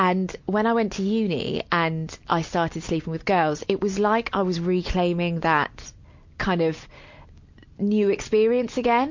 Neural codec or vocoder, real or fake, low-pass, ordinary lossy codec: none; real; 7.2 kHz; MP3, 48 kbps